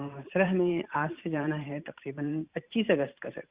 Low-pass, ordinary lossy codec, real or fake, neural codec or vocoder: 3.6 kHz; Opus, 64 kbps; fake; vocoder, 44.1 kHz, 128 mel bands every 512 samples, BigVGAN v2